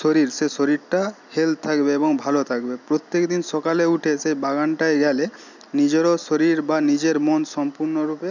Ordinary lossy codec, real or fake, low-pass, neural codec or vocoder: none; real; 7.2 kHz; none